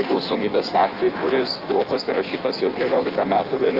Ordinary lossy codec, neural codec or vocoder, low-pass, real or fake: Opus, 24 kbps; codec, 16 kHz in and 24 kHz out, 1.1 kbps, FireRedTTS-2 codec; 5.4 kHz; fake